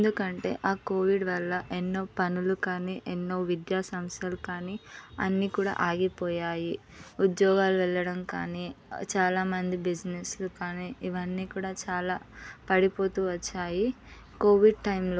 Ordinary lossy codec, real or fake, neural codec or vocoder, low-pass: none; real; none; none